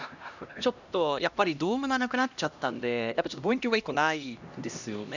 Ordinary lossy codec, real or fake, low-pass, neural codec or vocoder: none; fake; 7.2 kHz; codec, 16 kHz, 1 kbps, X-Codec, HuBERT features, trained on LibriSpeech